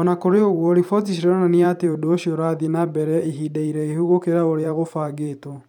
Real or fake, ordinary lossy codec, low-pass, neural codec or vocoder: fake; none; 19.8 kHz; vocoder, 44.1 kHz, 128 mel bands every 512 samples, BigVGAN v2